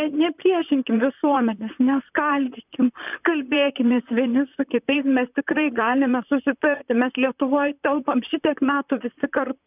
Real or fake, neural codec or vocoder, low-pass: fake; vocoder, 44.1 kHz, 128 mel bands, Pupu-Vocoder; 3.6 kHz